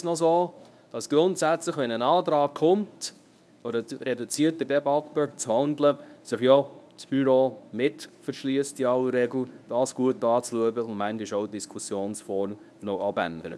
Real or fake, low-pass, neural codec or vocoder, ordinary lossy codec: fake; none; codec, 24 kHz, 0.9 kbps, WavTokenizer, medium speech release version 1; none